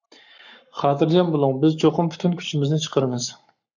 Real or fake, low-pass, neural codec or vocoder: fake; 7.2 kHz; codec, 44.1 kHz, 7.8 kbps, Pupu-Codec